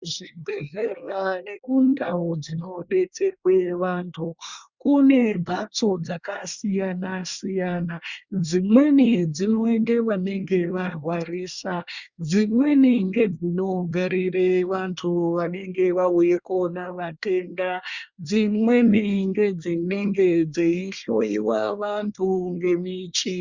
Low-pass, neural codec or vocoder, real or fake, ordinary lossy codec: 7.2 kHz; codec, 24 kHz, 1 kbps, SNAC; fake; Opus, 64 kbps